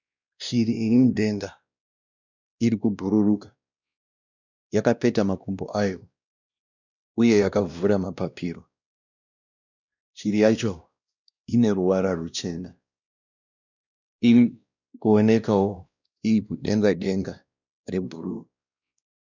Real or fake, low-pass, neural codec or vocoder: fake; 7.2 kHz; codec, 16 kHz, 1 kbps, X-Codec, WavLM features, trained on Multilingual LibriSpeech